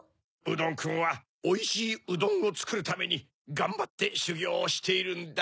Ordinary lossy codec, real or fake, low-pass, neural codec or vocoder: none; real; none; none